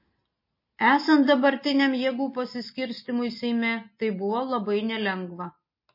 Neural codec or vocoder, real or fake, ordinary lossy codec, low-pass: none; real; MP3, 24 kbps; 5.4 kHz